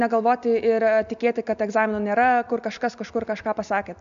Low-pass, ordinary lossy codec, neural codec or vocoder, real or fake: 7.2 kHz; MP3, 64 kbps; none; real